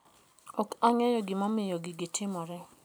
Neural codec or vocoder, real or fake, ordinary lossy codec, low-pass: none; real; none; none